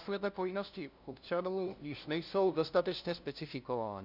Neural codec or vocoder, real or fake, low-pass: codec, 16 kHz, 0.5 kbps, FunCodec, trained on LibriTTS, 25 frames a second; fake; 5.4 kHz